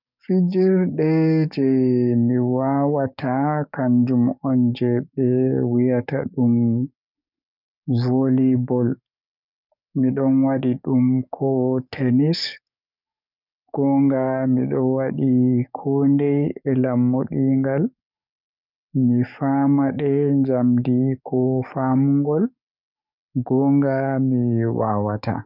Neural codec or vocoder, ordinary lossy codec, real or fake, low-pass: codec, 44.1 kHz, 7.8 kbps, DAC; none; fake; 5.4 kHz